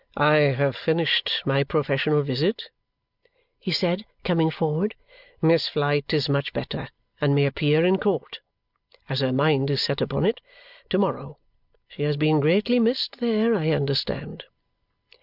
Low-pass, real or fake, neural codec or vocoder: 5.4 kHz; real; none